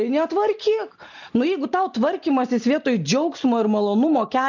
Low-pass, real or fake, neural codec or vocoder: 7.2 kHz; real; none